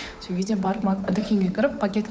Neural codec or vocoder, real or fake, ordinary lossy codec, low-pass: codec, 16 kHz, 8 kbps, FunCodec, trained on Chinese and English, 25 frames a second; fake; none; none